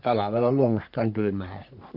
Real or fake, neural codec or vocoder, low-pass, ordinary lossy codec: fake; codec, 44.1 kHz, 3.4 kbps, Pupu-Codec; 5.4 kHz; none